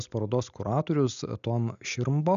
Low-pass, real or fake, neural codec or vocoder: 7.2 kHz; real; none